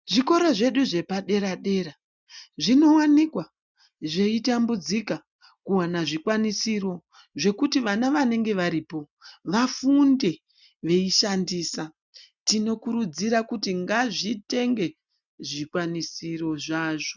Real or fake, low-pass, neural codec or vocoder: real; 7.2 kHz; none